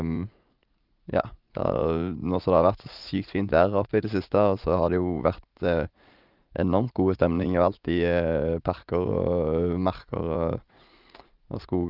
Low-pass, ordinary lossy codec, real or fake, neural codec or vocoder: 5.4 kHz; Opus, 32 kbps; fake; vocoder, 44.1 kHz, 80 mel bands, Vocos